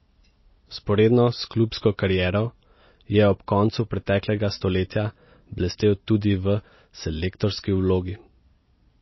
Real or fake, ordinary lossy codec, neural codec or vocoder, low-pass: real; MP3, 24 kbps; none; 7.2 kHz